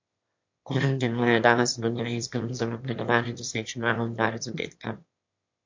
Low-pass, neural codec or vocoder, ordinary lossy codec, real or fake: 7.2 kHz; autoencoder, 22.05 kHz, a latent of 192 numbers a frame, VITS, trained on one speaker; MP3, 48 kbps; fake